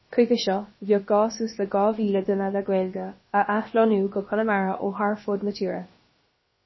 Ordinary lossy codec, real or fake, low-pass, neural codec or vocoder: MP3, 24 kbps; fake; 7.2 kHz; codec, 16 kHz, about 1 kbps, DyCAST, with the encoder's durations